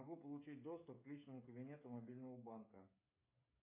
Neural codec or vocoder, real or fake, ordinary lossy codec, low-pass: codec, 16 kHz, 8 kbps, FreqCodec, smaller model; fake; MP3, 24 kbps; 3.6 kHz